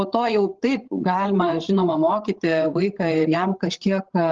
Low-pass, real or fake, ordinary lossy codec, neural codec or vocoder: 7.2 kHz; fake; Opus, 16 kbps; codec, 16 kHz, 8 kbps, FreqCodec, larger model